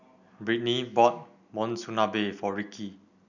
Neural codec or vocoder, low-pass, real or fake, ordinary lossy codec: none; 7.2 kHz; real; none